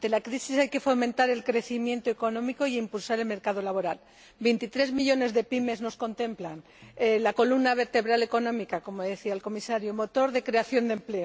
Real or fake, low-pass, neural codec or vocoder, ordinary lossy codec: real; none; none; none